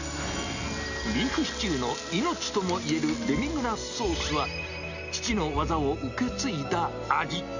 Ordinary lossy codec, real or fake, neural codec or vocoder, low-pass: none; real; none; 7.2 kHz